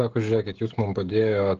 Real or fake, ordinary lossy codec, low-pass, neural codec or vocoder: fake; Opus, 16 kbps; 7.2 kHz; codec, 16 kHz, 16 kbps, FreqCodec, smaller model